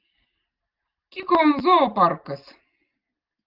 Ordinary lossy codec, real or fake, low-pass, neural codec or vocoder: Opus, 32 kbps; real; 5.4 kHz; none